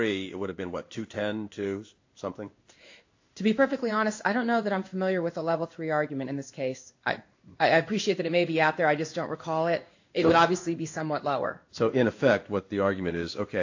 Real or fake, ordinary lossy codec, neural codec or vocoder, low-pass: fake; AAC, 48 kbps; codec, 16 kHz in and 24 kHz out, 1 kbps, XY-Tokenizer; 7.2 kHz